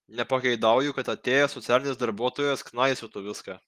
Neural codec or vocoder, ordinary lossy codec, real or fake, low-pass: none; Opus, 24 kbps; real; 14.4 kHz